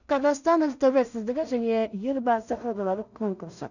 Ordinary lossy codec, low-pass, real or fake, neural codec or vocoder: none; 7.2 kHz; fake; codec, 16 kHz in and 24 kHz out, 0.4 kbps, LongCat-Audio-Codec, two codebook decoder